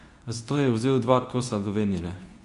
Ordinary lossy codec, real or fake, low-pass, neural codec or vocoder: none; fake; 10.8 kHz; codec, 24 kHz, 0.9 kbps, WavTokenizer, medium speech release version 2